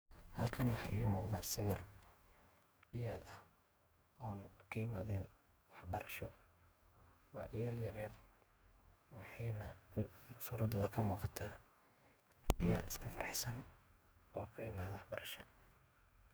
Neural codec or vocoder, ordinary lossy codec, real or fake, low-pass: codec, 44.1 kHz, 2.6 kbps, DAC; none; fake; none